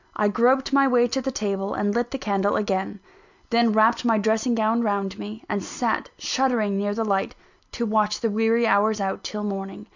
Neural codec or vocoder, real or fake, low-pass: none; real; 7.2 kHz